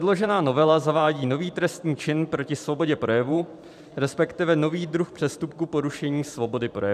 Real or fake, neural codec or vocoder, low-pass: fake; vocoder, 44.1 kHz, 128 mel bands every 512 samples, BigVGAN v2; 14.4 kHz